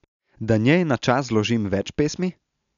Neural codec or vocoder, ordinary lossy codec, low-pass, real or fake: none; none; 7.2 kHz; real